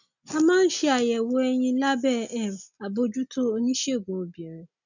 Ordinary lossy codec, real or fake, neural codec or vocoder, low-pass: none; real; none; 7.2 kHz